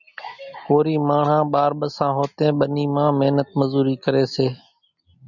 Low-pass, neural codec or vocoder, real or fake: 7.2 kHz; none; real